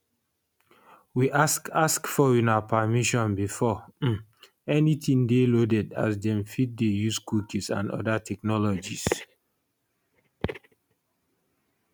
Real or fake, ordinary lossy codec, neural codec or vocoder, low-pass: real; none; none; none